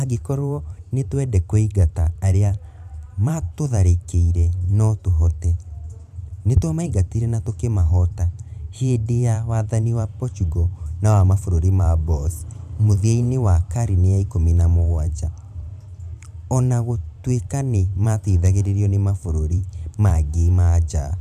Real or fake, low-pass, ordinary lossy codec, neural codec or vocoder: fake; 14.4 kHz; none; vocoder, 44.1 kHz, 128 mel bands every 512 samples, BigVGAN v2